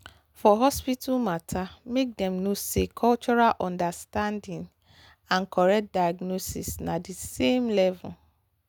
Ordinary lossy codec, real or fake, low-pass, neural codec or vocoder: none; real; none; none